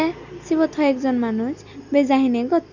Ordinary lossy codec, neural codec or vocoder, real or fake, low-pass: none; none; real; 7.2 kHz